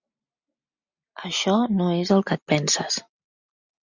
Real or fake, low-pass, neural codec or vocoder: real; 7.2 kHz; none